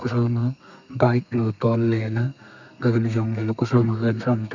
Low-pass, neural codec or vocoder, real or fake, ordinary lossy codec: 7.2 kHz; codec, 32 kHz, 1.9 kbps, SNAC; fake; none